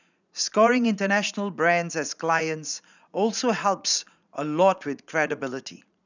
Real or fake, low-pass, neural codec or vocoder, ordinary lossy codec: fake; 7.2 kHz; vocoder, 44.1 kHz, 80 mel bands, Vocos; none